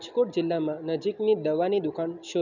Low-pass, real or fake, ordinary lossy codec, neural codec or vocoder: 7.2 kHz; real; none; none